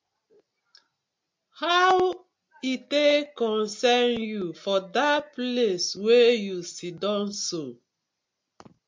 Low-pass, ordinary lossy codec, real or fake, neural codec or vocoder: 7.2 kHz; MP3, 64 kbps; real; none